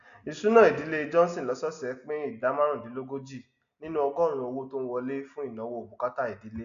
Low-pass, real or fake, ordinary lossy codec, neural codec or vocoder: 7.2 kHz; real; none; none